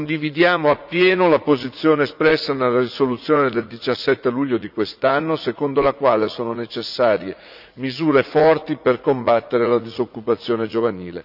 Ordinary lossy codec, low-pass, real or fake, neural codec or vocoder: none; 5.4 kHz; fake; vocoder, 44.1 kHz, 80 mel bands, Vocos